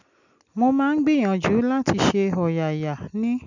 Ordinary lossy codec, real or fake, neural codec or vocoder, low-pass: none; real; none; 7.2 kHz